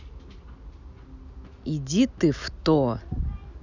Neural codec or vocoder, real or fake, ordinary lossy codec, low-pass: none; real; none; 7.2 kHz